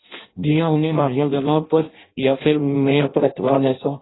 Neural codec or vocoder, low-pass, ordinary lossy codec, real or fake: codec, 16 kHz in and 24 kHz out, 0.6 kbps, FireRedTTS-2 codec; 7.2 kHz; AAC, 16 kbps; fake